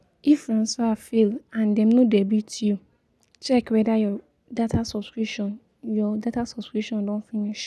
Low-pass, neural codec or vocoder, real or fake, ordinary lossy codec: none; none; real; none